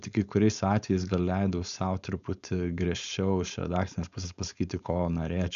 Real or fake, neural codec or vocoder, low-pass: fake; codec, 16 kHz, 4.8 kbps, FACodec; 7.2 kHz